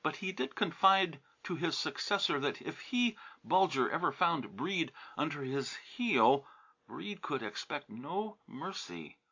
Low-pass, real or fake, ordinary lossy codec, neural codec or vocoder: 7.2 kHz; real; MP3, 64 kbps; none